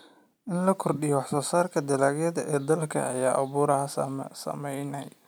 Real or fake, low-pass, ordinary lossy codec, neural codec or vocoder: real; none; none; none